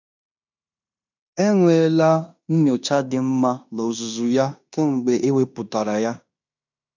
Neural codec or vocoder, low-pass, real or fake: codec, 16 kHz in and 24 kHz out, 0.9 kbps, LongCat-Audio-Codec, fine tuned four codebook decoder; 7.2 kHz; fake